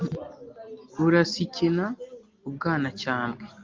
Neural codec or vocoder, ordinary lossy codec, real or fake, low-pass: none; Opus, 32 kbps; real; 7.2 kHz